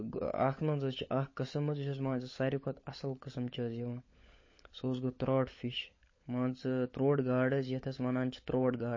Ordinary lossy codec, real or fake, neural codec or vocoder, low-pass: MP3, 32 kbps; fake; codec, 16 kHz, 16 kbps, FunCodec, trained on LibriTTS, 50 frames a second; 7.2 kHz